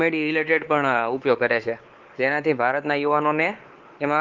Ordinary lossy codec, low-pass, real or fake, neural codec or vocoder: Opus, 16 kbps; 7.2 kHz; fake; codec, 16 kHz, 4 kbps, X-Codec, WavLM features, trained on Multilingual LibriSpeech